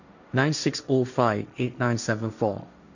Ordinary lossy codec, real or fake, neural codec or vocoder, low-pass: none; fake; codec, 16 kHz, 1.1 kbps, Voila-Tokenizer; 7.2 kHz